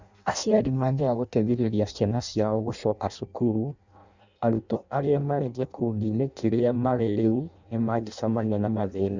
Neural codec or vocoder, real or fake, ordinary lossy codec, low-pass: codec, 16 kHz in and 24 kHz out, 0.6 kbps, FireRedTTS-2 codec; fake; Opus, 64 kbps; 7.2 kHz